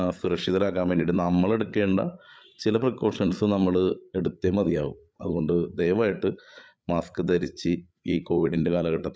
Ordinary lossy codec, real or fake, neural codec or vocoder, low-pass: none; fake; codec, 16 kHz, 8 kbps, FreqCodec, larger model; none